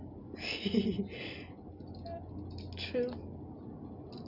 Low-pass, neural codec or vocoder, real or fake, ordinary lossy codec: 5.4 kHz; none; real; none